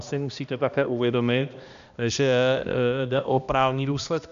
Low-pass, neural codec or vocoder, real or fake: 7.2 kHz; codec, 16 kHz, 1 kbps, X-Codec, HuBERT features, trained on balanced general audio; fake